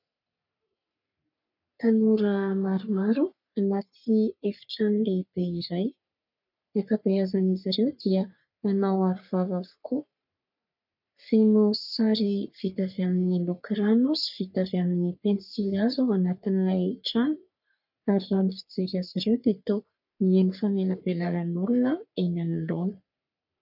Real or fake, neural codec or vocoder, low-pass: fake; codec, 44.1 kHz, 3.4 kbps, Pupu-Codec; 5.4 kHz